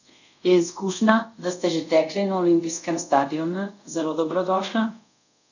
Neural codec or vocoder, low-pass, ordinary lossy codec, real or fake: codec, 24 kHz, 0.5 kbps, DualCodec; 7.2 kHz; none; fake